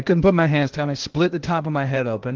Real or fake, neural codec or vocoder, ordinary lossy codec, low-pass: fake; codec, 16 kHz, 0.8 kbps, ZipCodec; Opus, 16 kbps; 7.2 kHz